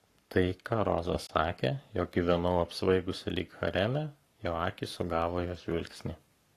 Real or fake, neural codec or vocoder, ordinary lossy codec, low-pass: fake; codec, 44.1 kHz, 7.8 kbps, Pupu-Codec; AAC, 48 kbps; 14.4 kHz